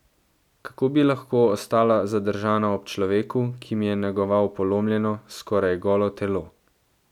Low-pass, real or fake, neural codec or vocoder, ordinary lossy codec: 19.8 kHz; real; none; none